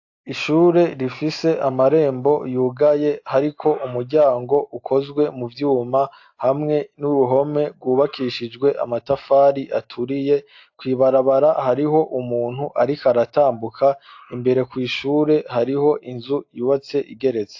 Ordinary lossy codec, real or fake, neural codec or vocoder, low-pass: AAC, 48 kbps; real; none; 7.2 kHz